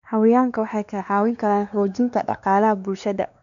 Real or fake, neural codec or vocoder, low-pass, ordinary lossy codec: fake; codec, 16 kHz, 2 kbps, X-Codec, WavLM features, trained on Multilingual LibriSpeech; 7.2 kHz; none